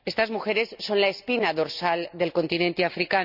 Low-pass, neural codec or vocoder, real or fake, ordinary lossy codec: 5.4 kHz; none; real; none